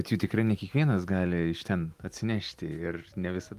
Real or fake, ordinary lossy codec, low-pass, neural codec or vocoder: real; Opus, 32 kbps; 14.4 kHz; none